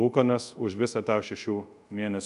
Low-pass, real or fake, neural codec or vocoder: 10.8 kHz; fake; codec, 24 kHz, 0.5 kbps, DualCodec